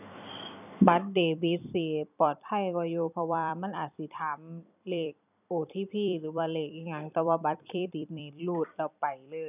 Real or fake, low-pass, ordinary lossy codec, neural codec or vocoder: fake; 3.6 kHz; none; vocoder, 44.1 kHz, 128 mel bands every 256 samples, BigVGAN v2